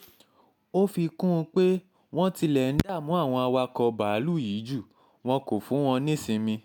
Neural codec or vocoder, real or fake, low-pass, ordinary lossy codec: none; real; 19.8 kHz; none